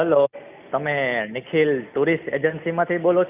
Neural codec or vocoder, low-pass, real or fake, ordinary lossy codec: none; 3.6 kHz; real; none